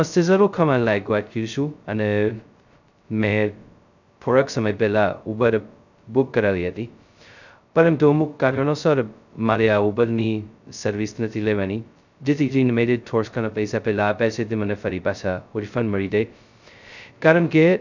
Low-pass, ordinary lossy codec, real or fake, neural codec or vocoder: 7.2 kHz; none; fake; codec, 16 kHz, 0.2 kbps, FocalCodec